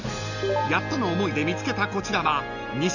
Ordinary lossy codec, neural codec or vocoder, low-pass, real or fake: none; none; 7.2 kHz; real